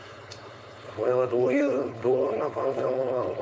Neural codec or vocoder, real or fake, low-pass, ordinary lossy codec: codec, 16 kHz, 4.8 kbps, FACodec; fake; none; none